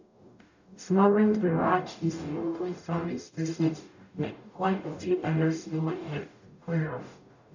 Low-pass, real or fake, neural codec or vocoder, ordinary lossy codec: 7.2 kHz; fake; codec, 44.1 kHz, 0.9 kbps, DAC; none